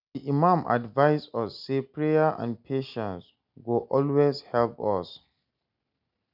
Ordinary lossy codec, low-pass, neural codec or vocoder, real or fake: none; 5.4 kHz; none; real